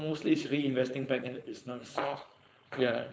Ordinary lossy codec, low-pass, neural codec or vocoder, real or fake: none; none; codec, 16 kHz, 4.8 kbps, FACodec; fake